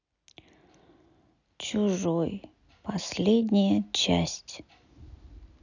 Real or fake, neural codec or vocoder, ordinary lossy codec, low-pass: real; none; none; 7.2 kHz